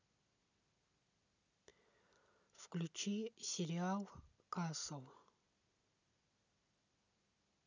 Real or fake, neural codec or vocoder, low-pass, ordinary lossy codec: real; none; 7.2 kHz; none